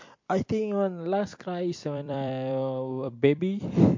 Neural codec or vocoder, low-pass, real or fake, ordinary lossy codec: vocoder, 44.1 kHz, 128 mel bands every 256 samples, BigVGAN v2; 7.2 kHz; fake; MP3, 48 kbps